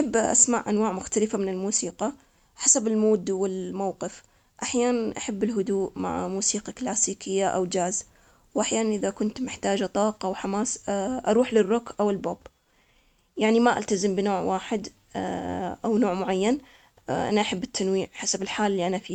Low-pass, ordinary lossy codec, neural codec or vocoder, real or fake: 19.8 kHz; none; none; real